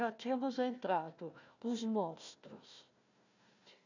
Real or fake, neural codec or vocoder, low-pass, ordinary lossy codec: fake; codec, 16 kHz, 1 kbps, FunCodec, trained on Chinese and English, 50 frames a second; 7.2 kHz; none